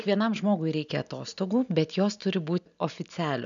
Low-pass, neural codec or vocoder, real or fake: 7.2 kHz; none; real